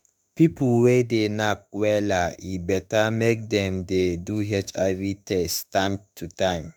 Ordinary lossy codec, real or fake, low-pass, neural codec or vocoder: none; fake; none; autoencoder, 48 kHz, 32 numbers a frame, DAC-VAE, trained on Japanese speech